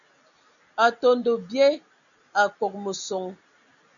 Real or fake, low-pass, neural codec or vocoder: real; 7.2 kHz; none